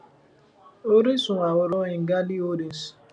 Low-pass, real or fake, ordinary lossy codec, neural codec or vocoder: 9.9 kHz; real; none; none